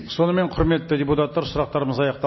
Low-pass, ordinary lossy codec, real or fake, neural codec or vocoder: 7.2 kHz; MP3, 24 kbps; real; none